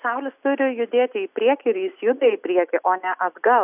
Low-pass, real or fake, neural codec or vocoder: 3.6 kHz; real; none